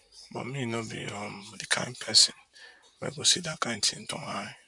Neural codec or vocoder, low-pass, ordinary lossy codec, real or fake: vocoder, 44.1 kHz, 128 mel bands, Pupu-Vocoder; 10.8 kHz; none; fake